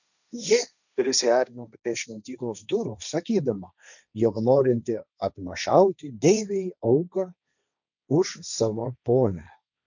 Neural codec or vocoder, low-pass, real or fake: codec, 16 kHz, 1.1 kbps, Voila-Tokenizer; 7.2 kHz; fake